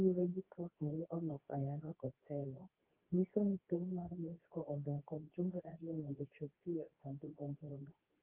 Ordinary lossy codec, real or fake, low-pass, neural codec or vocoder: Opus, 32 kbps; fake; 3.6 kHz; codec, 24 kHz, 0.9 kbps, WavTokenizer, medium speech release version 2